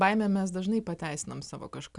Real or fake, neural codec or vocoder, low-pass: real; none; 10.8 kHz